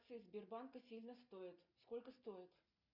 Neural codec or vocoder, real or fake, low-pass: none; real; 5.4 kHz